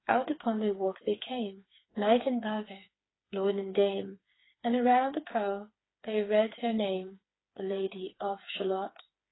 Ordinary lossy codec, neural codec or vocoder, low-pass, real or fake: AAC, 16 kbps; codec, 16 kHz, 4 kbps, FreqCodec, smaller model; 7.2 kHz; fake